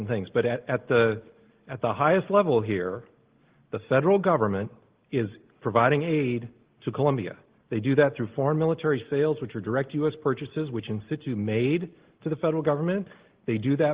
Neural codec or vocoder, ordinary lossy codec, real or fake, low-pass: none; Opus, 16 kbps; real; 3.6 kHz